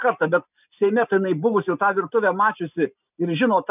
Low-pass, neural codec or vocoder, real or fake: 3.6 kHz; none; real